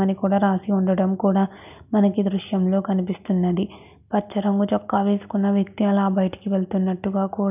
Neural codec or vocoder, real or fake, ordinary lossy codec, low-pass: none; real; none; 3.6 kHz